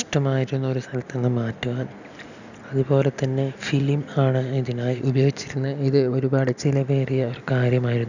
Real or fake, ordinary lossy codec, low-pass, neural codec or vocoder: real; none; 7.2 kHz; none